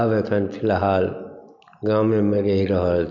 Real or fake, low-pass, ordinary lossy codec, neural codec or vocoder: real; 7.2 kHz; none; none